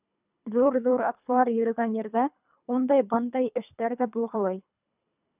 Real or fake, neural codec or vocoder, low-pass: fake; codec, 24 kHz, 3 kbps, HILCodec; 3.6 kHz